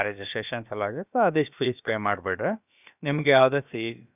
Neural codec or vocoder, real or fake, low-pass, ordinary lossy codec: codec, 16 kHz, about 1 kbps, DyCAST, with the encoder's durations; fake; 3.6 kHz; none